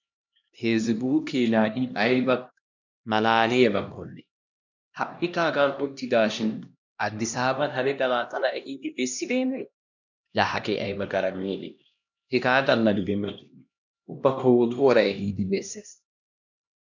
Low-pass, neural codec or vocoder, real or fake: 7.2 kHz; codec, 16 kHz, 1 kbps, X-Codec, HuBERT features, trained on LibriSpeech; fake